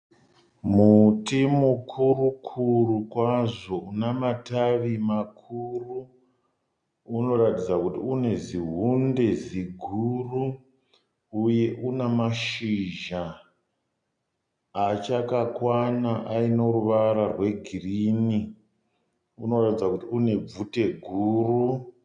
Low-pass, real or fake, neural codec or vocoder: 9.9 kHz; real; none